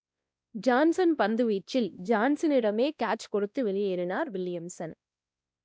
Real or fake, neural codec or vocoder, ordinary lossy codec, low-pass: fake; codec, 16 kHz, 1 kbps, X-Codec, WavLM features, trained on Multilingual LibriSpeech; none; none